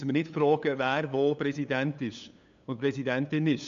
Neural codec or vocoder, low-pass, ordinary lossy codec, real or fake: codec, 16 kHz, 8 kbps, FunCodec, trained on LibriTTS, 25 frames a second; 7.2 kHz; MP3, 64 kbps; fake